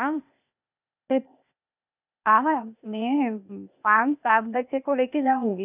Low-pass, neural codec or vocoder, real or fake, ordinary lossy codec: 3.6 kHz; codec, 16 kHz, 0.8 kbps, ZipCodec; fake; none